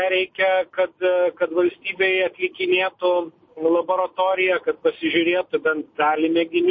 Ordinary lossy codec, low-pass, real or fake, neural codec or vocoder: MP3, 32 kbps; 7.2 kHz; real; none